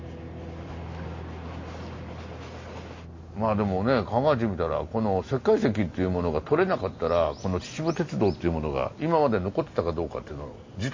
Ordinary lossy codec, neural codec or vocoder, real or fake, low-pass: MP3, 32 kbps; none; real; 7.2 kHz